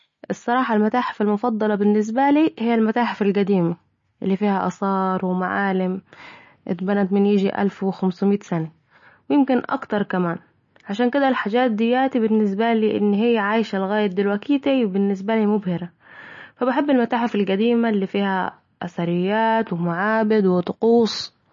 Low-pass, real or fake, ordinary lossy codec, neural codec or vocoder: 7.2 kHz; real; MP3, 32 kbps; none